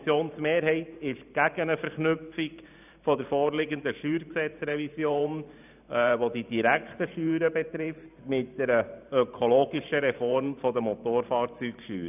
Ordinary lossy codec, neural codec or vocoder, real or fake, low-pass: none; vocoder, 44.1 kHz, 128 mel bands every 512 samples, BigVGAN v2; fake; 3.6 kHz